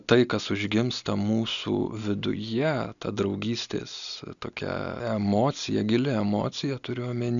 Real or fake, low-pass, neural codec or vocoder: real; 7.2 kHz; none